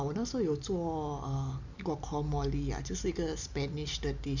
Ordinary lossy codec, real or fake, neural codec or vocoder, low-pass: none; real; none; 7.2 kHz